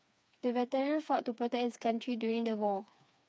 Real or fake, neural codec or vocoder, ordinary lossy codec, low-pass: fake; codec, 16 kHz, 4 kbps, FreqCodec, smaller model; none; none